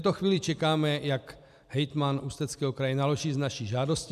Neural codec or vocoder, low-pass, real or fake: vocoder, 44.1 kHz, 128 mel bands every 256 samples, BigVGAN v2; 14.4 kHz; fake